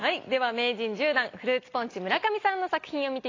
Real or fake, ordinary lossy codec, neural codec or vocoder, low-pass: real; AAC, 32 kbps; none; 7.2 kHz